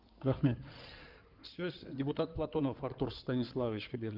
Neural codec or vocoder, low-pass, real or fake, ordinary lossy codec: codec, 16 kHz in and 24 kHz out, 2.2 kbps, FireRedTTS-2 codec; 5.4 kHz; fake; Opus, 32 kbps